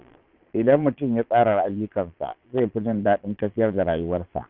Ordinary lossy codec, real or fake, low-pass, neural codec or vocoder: none; fake; 5.4 kHz; vocoder, 22.05 kHz, 80 mel bands, Vocos